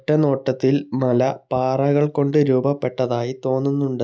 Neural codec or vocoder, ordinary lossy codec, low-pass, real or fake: none; none; none; real